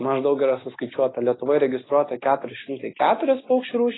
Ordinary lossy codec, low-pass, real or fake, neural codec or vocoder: AAC, 16 kbps; 7.2 kHz; real; none